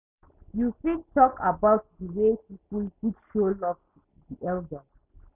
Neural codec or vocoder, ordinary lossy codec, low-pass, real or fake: none; none; 3.6 kHz; real